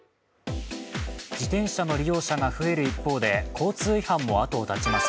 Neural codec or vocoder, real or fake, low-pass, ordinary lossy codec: none; real; none; none